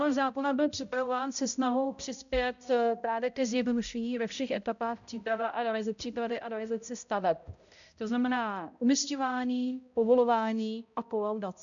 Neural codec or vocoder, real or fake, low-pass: codec, 16 kHz, 0.5 kbps, X-Codec, HuBERT features, trained on balanced general audio; fake; 7.2 kHz